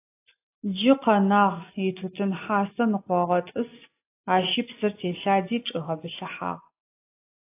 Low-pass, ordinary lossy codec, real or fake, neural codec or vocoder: 3.6 kHz; AAC, 24 kbps; real; none